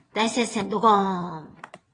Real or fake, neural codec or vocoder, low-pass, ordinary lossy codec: fake; vocoder, 22.05 kHz, 80 mel bands, WaveNeXt; 9.9 kHz; AAC, 32 kbps